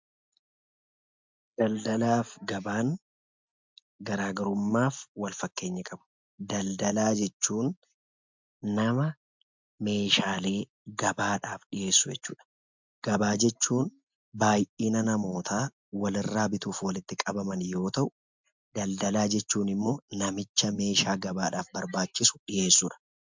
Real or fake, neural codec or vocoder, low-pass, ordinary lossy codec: real; none; 7.2 kHz; MP3, 64 kbps